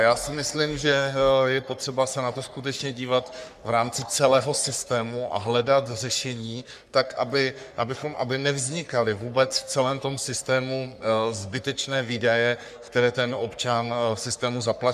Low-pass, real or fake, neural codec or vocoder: 14.4 kHz; fake; codec, 44.1 kHz, 3.4 kbps, Pupu-Codec